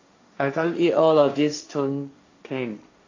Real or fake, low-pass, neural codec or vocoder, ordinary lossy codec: fake; 7.2 kHz; codec, 16 kHz, 1.1 kbps, Voila-Tokenizer; AAC, 48 kbps